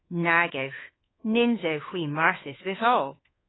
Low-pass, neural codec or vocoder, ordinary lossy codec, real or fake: 7.2 kHz; codec, 24 kHz, 1.2 kbps, DualCodec; AAC, 16 kbps; fake